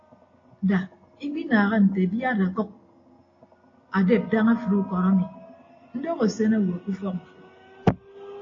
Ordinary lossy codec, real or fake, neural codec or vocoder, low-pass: AAC, 32 kbps; real; none; 7.2 kHz